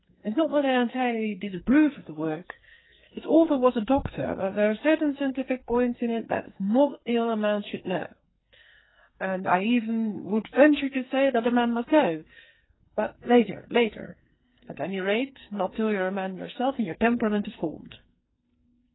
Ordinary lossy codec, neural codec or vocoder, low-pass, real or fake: AAC, 16 kbps; codec, 44.1 kHz, 2.6 kbps, SNAC; 7.2 kHz; fake